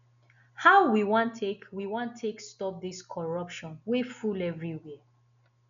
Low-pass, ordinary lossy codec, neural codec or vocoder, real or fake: 7.2 kHz; none; none; real